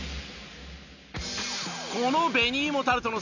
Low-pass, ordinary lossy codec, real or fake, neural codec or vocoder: 7.2 kHz; none; real; none